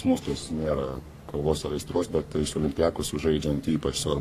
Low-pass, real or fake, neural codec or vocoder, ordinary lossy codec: 14.4 kHz; fake; codec, 44.1 kHz, 3.4 kbps, Pupu-Codec; AAC, 64 kbps